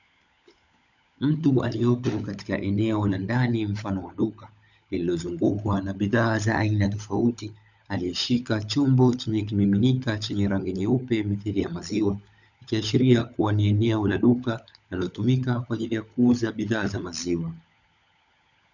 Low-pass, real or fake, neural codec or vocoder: 7.2 kHz; fake; codec, 16 kHz, 16 kbps, FunCodec, trained on LibriTTS, 50 frames a second